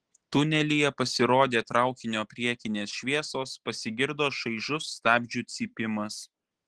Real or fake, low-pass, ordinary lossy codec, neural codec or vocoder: real; 10.8 kHz; Opus, 16 kbps; none